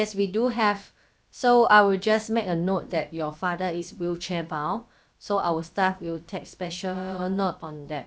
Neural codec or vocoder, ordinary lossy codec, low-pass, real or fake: codec, 16 kHz, about 1 kbps, DyCAST, with the encoder's durations; none; none; fake